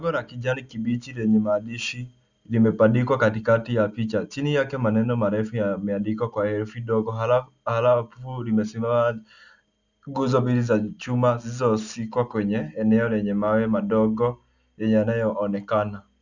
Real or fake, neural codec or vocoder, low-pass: real; none; 7.2 kHz